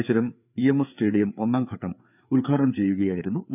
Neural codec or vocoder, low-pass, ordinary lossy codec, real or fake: codec, 16 kHz, 4 kbps, FreqCodec, larger model; 3.6 kHz; none; fake